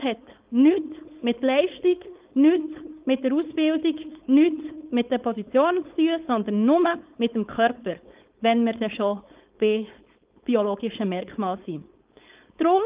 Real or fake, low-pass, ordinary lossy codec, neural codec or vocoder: fake; 3.6 kHz; Opus, 32 kbps; codec, 16 kHz, 4.8 kbps, FACodec